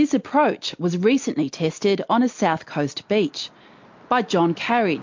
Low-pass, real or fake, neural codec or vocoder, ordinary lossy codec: 7.2 kHz; real; none; MP3, 48 kbps